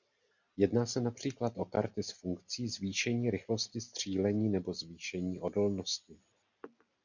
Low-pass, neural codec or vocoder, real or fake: 7.2 kHz; none; real